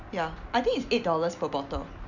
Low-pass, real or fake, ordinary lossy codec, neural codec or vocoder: 7.2 kHz; real; none; none